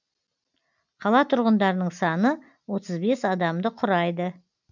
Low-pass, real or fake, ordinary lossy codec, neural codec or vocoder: 7.2 kHz; real; none; none